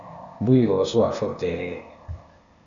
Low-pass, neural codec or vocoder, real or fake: 7.2 kHz; codec, 16 kHz, 0.8 kbps, ZipCodec; fake